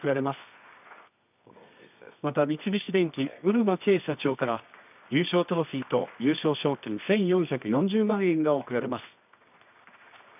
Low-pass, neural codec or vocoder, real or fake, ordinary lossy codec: 3.6 kHz; codec, 24 kHz, 0.9 kbps, WavTokenizer, medium music audio release; fake; none